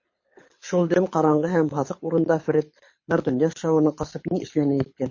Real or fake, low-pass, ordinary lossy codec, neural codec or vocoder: fake; 7.2 kHz; MP3, 32 kbps; codec, 24 kHz, 6 kbps, HILCodec